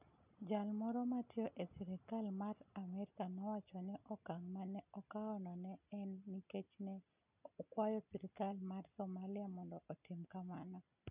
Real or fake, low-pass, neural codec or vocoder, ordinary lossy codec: real; 3.6 kHz; none; none